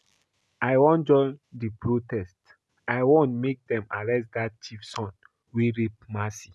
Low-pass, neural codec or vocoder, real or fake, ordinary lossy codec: none; none; real; none